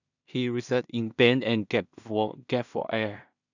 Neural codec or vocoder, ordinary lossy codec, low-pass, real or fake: codec, 16 kHz in and 24 kHz out, 0.4 kbps, LongCat-Audio-Codec, two codebook decoder; none; 7.2 kHz; fake